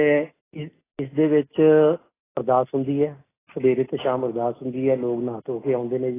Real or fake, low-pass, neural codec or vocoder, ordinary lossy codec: real; 3.6 kHz; none; AAC, 16 kbps